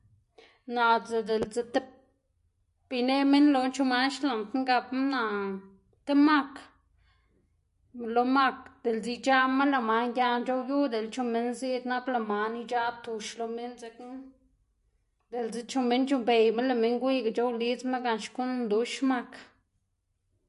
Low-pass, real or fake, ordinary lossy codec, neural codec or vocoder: 14.4 kHz; real; MP3, 48 kbps; none